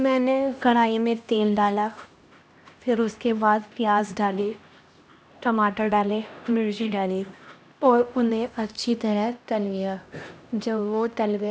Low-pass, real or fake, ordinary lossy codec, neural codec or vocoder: none; fake; none; codec, 16 kHz, 1 kbps, X-Codec, HuBERT features, trained on LibriSpeech